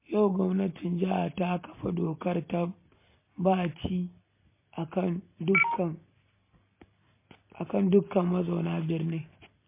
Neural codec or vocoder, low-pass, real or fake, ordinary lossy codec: none; 3.6 kHz; real; MP3, 24 kbps